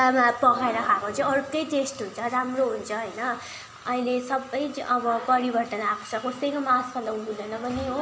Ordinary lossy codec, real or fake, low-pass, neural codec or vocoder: none; real; none; none